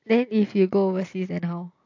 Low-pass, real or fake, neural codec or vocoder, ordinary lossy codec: 7.2 kHz; real; none; AAC, 48 kbps